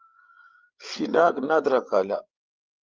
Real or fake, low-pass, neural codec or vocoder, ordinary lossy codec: fake; 7.2 kHz; codec, 16 kHz, 8 kbps, FreqCodec, larger model; Opus, 32 kbps